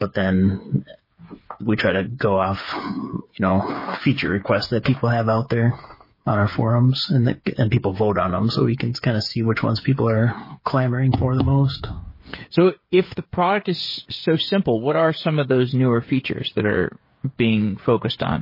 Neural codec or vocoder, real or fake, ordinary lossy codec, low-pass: codec, 16 kHz, 8 kbps, FreqCodec, smaller model; fake; MP3, 24 kbps; 5.4 kHz